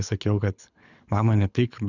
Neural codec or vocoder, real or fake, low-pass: codec, 24 kHz, 6 kbps, HILCodec; fake; 7.2 kHz